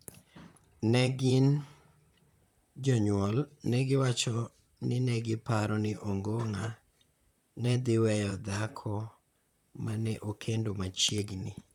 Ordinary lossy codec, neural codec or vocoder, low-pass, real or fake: none; vocoder, 44.1 kHz, 128 mel bands, Pupu-Vocoder; 19.8 kHz; fake